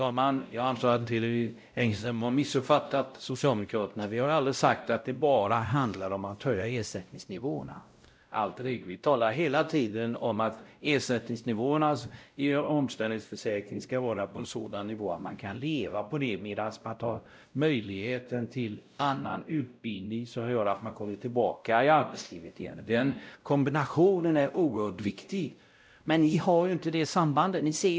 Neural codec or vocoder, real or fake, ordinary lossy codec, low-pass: codec, 16 kHz, 0.5 kbps, X-Codec, WavLM features, trained on Multilingual LibriSpeech; fake; none; none